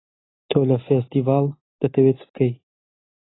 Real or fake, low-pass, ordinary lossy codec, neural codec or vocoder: real; 7.2 kHz; AAC, 16 kbps; none